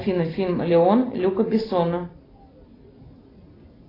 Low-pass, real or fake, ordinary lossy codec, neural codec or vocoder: 5.4 kHz; real; AAC, 24 kbps; none